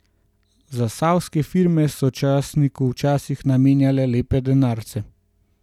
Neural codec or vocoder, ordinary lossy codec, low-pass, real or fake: none; none; 19.8 kHz; real